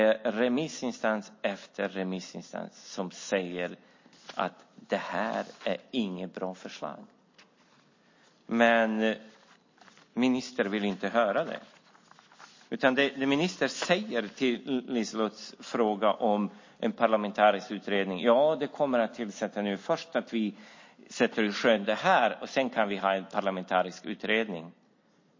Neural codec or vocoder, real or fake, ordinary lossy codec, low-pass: none; real; MP3, 32 kbps; 7.2 kHz